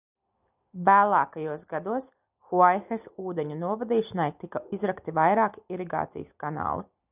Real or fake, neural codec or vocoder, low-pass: real; none; 3.6 kHz